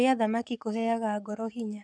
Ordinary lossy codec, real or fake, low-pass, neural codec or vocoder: none; fake; 9.9 kHz; codec, 44.1 kHz, 7.8 kbps, Pupu-Codec